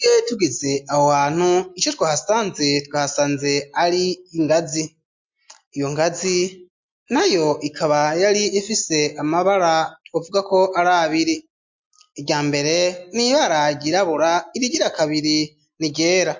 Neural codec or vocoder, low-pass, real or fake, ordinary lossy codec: none; 7.2 kHz; real; MP3, 48 kbps